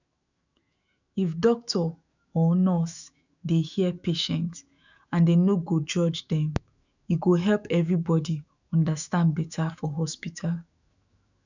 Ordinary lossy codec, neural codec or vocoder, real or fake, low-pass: none; autoencoder, 48 kHz, 128 numbers a frame, DAC-VAE, trained on Japanese speech; fake; 7.2 kHz